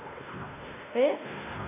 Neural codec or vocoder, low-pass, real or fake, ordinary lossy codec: codec, 16 kHz, 1 kbps, X-Codec, WavLM features, trained on Multilingual LibriSpeech; 3.6 kHz; fake; none